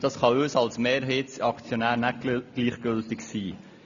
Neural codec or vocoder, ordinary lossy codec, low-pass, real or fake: none; none; 7.2 kHz; real